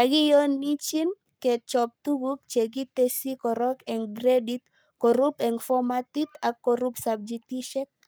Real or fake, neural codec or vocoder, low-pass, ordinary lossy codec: fake; codec, 44.1 kHz, 7.8 kbps, Pupu-Codec; none; none